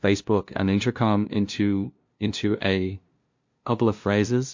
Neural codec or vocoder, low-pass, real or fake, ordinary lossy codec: codec, 16 kHz, 0.5 kbps, FunCodec, trained on LibriTTS, 25 frames a second; 7.2 kHz; fake; MP3, 48 kbps